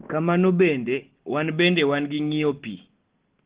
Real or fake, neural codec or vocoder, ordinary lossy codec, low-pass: real; none; Opus, 16 kbps; 3.6 kHz